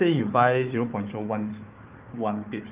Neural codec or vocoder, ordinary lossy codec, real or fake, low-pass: codec, 16 kHz, 4 kbps, FunCodec, trained on Chinese and English, 50 frames a second; Opus, 32 kbps; fake; 3.6 kHz